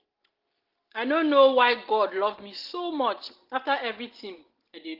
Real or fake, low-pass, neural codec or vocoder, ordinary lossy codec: real; 5.4 kHz; none; Opus, 16 kbps